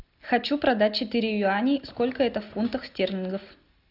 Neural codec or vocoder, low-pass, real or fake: none; 5.4 kHz; real